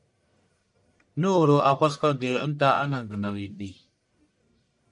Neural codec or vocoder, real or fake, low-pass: codec, 44.1 kHz, 1.7 kbps, Pupu-Codec; fake; 10.8 kHz